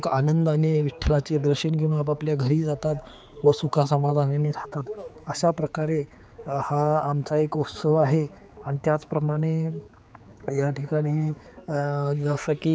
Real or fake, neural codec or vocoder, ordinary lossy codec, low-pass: fake; codec, 16 kHz, 4 kbps, X-Codec, HuBERT features, trained on general audio; none; none